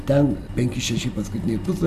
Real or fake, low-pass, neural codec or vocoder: fake; 14.4 kHz; vocoder, 44.1 kHz, 128 mel bands every 256 samples, BigVGAN v2